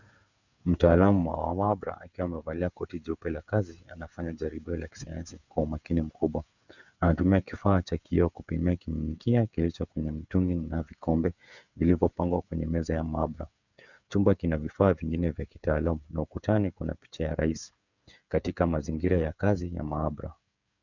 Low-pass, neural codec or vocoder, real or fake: 7.2 kHz; codec, 16 kHz, 8 kbps, FreqCodec, smaller model; fake